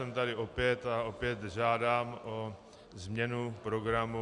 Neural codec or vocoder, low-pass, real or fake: none; 10.8 kHz; real